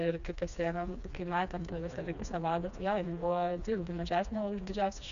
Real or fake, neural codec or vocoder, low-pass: fake; codec, 16 kHz, 2 kbps, FreqCodec, smaller model; 7.2 kHz